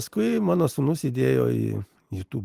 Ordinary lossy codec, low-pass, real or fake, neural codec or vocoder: Opus, 24 kbps; 14.4 kHz; real; none